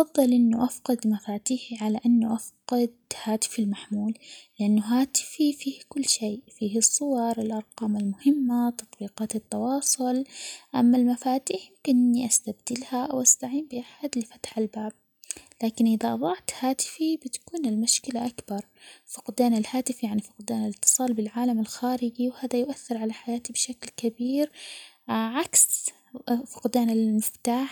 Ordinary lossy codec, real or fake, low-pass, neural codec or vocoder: none; real; none; none